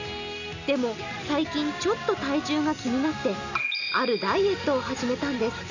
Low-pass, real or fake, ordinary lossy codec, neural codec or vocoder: 7.2 kHz; real; none; none